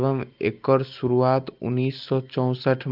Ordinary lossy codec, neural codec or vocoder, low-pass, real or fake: Opus, 24 kbps; none; 5.4 kHz; real